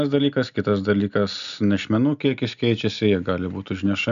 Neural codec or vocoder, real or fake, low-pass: none; real; 7.2 kHz